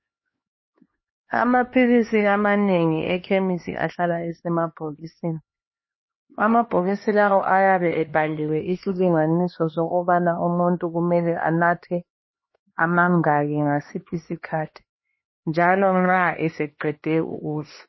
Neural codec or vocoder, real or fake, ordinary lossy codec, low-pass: codec, 16 kHz, 2 kbps, X-Codec, HuBERT features, trained on LibriSpeech; fake; MP3, 24 kbps; 7.2 kHz